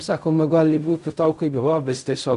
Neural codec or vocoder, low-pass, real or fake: codec, 16 kHz in and 24 kHz out, 0.4 kbps, LongCat-Audio-Codec, fine tuned four codebook decoder; 10.8 kHz; fake